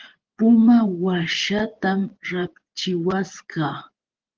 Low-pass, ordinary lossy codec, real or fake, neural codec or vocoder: 7.2 kHz; Opus, 16 kbps; fake; vocoder, 24 kHz, 100 mel bands, Vocos